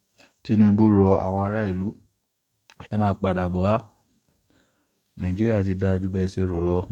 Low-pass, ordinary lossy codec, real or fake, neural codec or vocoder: 19.8 kHz; none; fake; codec, 44.1 kHz, 2.6 kbps, DAC